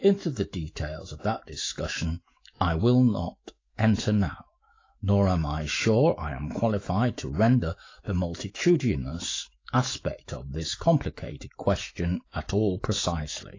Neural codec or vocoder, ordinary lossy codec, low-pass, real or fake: autoencoder, 48 kHz, 128 numbers a frame, DAC-VAE, trained on Japanese speech; AAC, 32 kbps; 7.2 kHz; fake